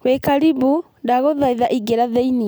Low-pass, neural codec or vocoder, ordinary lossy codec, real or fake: none; none; none; real